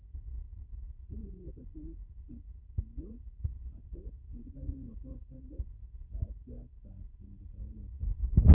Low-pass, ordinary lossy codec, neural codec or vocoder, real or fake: 3.6 kHz; none; codec, 16 kHz, 8 kbps, FunCodec, trained on Chinese and English, 25 frames a second; fake